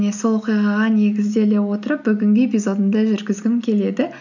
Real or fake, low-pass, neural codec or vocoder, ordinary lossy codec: real; 7.2 kHz; none; none